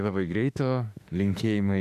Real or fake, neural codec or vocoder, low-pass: fake; autoencoder, 48 kHz, 32 numbers a frame, DAC-VAE, trained on Japanese speech; 14.4 kHz